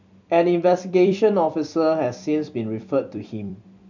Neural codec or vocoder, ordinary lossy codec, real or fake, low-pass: vocoder, 44.1 kHz, 128 mel bands every 256 samples, BigVGAN v2; none; fake; 7.2 kHz